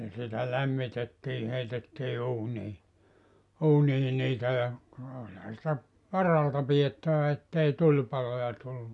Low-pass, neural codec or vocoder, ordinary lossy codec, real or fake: 10.8 kHz; none; none; real